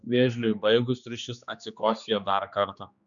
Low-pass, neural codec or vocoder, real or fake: 7.2 kHz; codec, 16 kHz, 2 kbps, X-Codec, HuBERT features, trained on general audio; fake